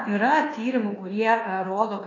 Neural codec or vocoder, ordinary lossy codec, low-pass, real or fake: codec, 24 kHz, 1.2 kbps, DualCodec; AAC, 32 kbps; 7.2 kHz; fake